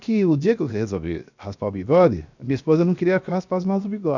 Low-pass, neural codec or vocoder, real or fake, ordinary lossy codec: 7.2 kHz; codec, 16 kHz, 0.7 kbps, FocalCodec; fake; none